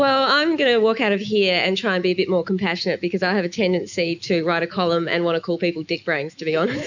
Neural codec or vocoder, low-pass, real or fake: autoencoder, 48 kHz, 128 numbers a frame, DAC-VAE, trained on Japanese speech; 7.2 kHz; fake